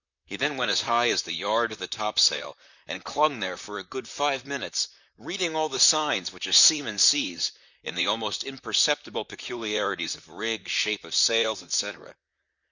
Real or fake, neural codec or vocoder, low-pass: fake; vocoder, 44.1 kHz, 128 mel bands, Pupu-Vocoder; 7.2 kHz